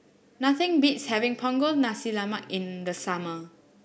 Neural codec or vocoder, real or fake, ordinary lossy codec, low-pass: none; real; none; none